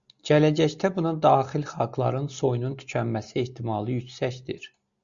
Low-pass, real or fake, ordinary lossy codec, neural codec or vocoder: 7.2 kHz; real; Opus, 64 kbps; none